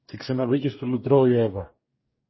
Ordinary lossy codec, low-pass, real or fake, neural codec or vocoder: MP3, 24 kbps; 7.2 kHz; fake; codec, 44.1 kHz, 2.6 kbps, DAC